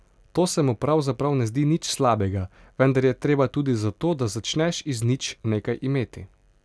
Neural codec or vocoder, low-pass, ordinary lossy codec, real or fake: none; none; none; real